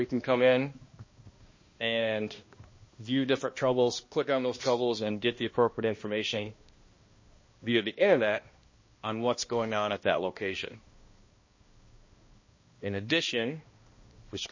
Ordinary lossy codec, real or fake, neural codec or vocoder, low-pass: MP3, 32 kbps; fake; codec, 16 kHz, 1 kbps, X-Codec, HuBERT features, trained on balanced general audio; 7.2 kHz